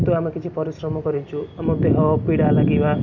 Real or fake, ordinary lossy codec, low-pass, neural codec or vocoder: real; none; 7.2 kHz; none